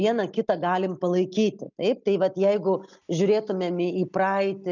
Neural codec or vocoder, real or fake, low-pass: none; real; 7.2 kHz